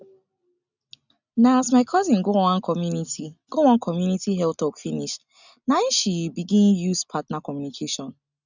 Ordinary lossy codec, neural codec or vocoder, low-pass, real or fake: none; none; 7.2 kHz; real